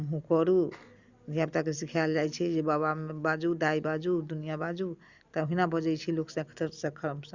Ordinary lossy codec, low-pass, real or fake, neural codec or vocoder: Opus, 64 kbps; 7.2 kHz; real; none